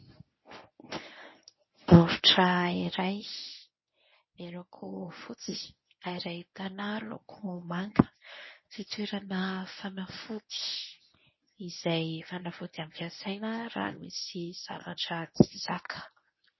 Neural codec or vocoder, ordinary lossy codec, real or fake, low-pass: codec, 24 kHz, 0.9 kbps, WavTokenizer, medium speech release version 1; MP3, 24 kbps; fake; 7.2 kHz